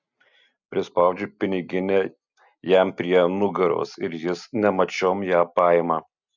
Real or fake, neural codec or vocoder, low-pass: real; none; 7.2 kHz